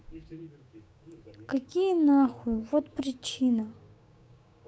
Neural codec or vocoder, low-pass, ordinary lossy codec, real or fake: codec, 16 kHz, 6 kbps, DAC; none; none; fake